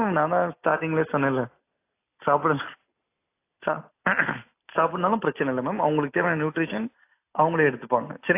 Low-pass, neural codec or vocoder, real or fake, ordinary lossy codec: 3.6 kHz; none; real; AAC, 24 kbps